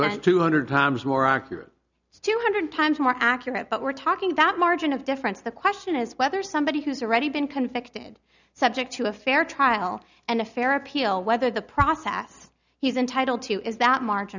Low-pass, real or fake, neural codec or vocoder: 7.2 kHz; real; none